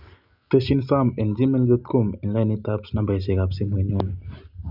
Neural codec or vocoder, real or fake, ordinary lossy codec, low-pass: none; real; none; 5.4 kHz